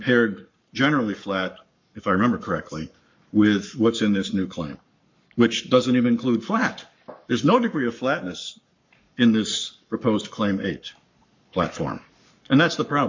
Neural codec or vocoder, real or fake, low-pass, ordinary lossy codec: codec, 44.1 kHz, 7.8 kbps, Pupu-Codec; fake; 7.2 kHz; MP3, 48 kbps